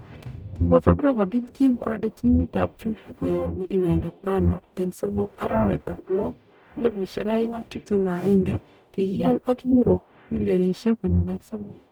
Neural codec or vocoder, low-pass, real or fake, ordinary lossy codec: codec, 44.1 kHz, 0.9 kbps, DAC; none; fake; none